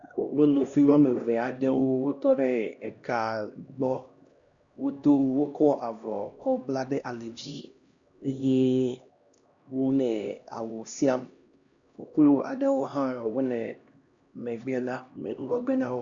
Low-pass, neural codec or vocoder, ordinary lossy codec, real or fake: 7.2 kHz; codec, 16 kHz, 1 kbps, X-Codec, HuBERT features, trained on LibriSpeech; Opus, 64 kbps; fake